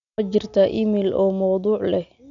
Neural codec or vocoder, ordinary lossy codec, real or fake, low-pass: none; none; real; 7.2 kHz